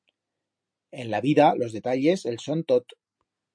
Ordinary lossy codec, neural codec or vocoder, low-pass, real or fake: MP3, 48 kbps; none; 9.9 kHz; real